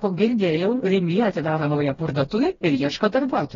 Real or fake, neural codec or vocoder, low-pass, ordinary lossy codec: fake; codec, 16 kHz, 1 kbps, FreqCodec, smaller model; 7.2 kHz; AAC, 24 kbps